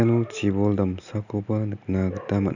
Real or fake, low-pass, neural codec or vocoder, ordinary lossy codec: real; 7.2 kHz; none; none